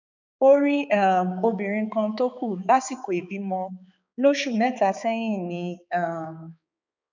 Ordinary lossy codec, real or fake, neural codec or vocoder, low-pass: none; fake; codec, 16 kHz, 4 kbps, X-Codec, HuBERT features, trained on balanced general audio; 7.2 kHz